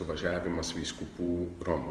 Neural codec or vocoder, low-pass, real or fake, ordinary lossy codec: vocoder, 44.1 kHz, 128 mel bands every 512 samples, BigVGAN v2; 10.8 kHz; fake; Opus, 32 kbps